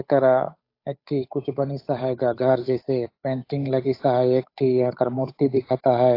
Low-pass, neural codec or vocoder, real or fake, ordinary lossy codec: 5.4 kHz; codec, 16 kHz, 8 kbps, FunCodec, trained on Chinese and English, 25 frames a second; fake; AAC, 32 kbps